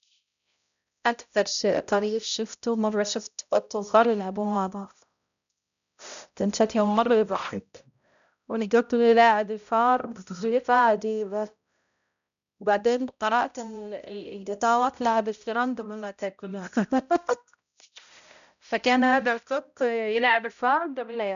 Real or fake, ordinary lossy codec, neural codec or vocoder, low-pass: fake; none; codec, 16 kHz, 0.5 kbps, X-Codec, HuBERT features, trained on balanced general audio; 7.2 kHz